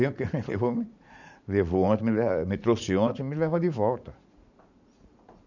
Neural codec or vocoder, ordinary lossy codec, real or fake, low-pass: vocoder, 44.1 kHz, 80 mel bands, Vocos; none; fake; 7.2 kHz